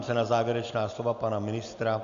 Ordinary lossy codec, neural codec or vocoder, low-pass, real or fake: Opus, 64 kbps; none; 7.2 kHz; real